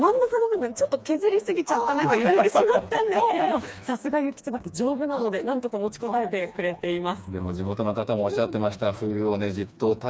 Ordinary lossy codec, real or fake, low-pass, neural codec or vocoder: none; fake; none; codec, 16 kHz, 2 kbps, FreqCodec, smaller model